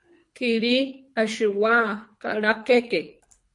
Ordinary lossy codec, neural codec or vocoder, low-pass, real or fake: MP3, 48 kbps; codec, 24 kHz, 3 kbps, HILCodec; 10.8 kHz; fake